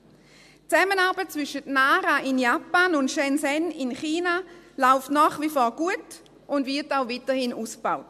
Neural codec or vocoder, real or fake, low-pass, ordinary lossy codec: none; real; 14.4 kHz; MP3, 64 kbps